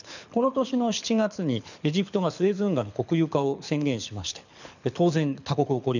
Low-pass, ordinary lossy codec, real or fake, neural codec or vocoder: 7.2 kHz; none; fake; codec, 24 kHz, 6 kbps, HILCodec